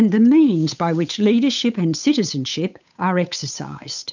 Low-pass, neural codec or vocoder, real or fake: 7.2 kHz; codec, 16 kHz, 8 kbps, FunCodec, trained on Chinese and English, 25 frames a second; fake